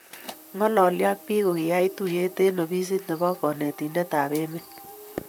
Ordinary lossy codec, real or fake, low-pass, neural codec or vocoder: none; fake; none; vocoder, 44.1 kHz, 128 mel bands, Pupu-Vocoder